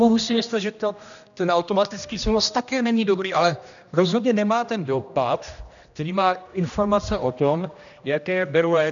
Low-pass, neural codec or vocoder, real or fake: 7.2 kHz; codec, 16 kHz, 1 kbps, X-Codec, HuBERT features, trained on general audio; fake